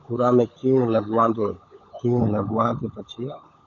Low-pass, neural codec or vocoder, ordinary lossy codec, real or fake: 7.2 kHz; codec, 16 kHz, 16 kbps, FunCodec, trained on LibriTTS, 50 frames a second; AAC, 64 kbps; fake